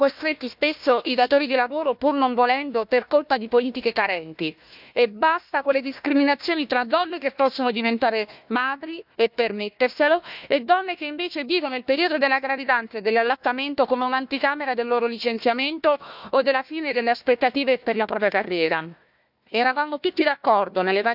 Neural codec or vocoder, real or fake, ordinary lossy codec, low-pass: codec, 16 kHz, 1 kbps, FunCodec, trained on Chinese and English, 50 frames a second; fake; none; 5.4 kHz